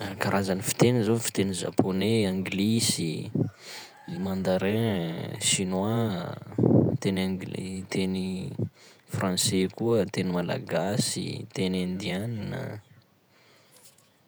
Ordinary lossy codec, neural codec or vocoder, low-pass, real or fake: none; vocoder, 48 kHz, 128 mel bands, Vocos; none; fake